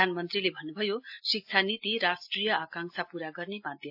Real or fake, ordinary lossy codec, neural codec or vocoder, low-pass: real; AAC, 48 kbps; none; 5.4 kHz